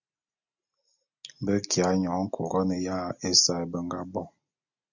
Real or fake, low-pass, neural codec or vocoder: real; 7.2 kHz; none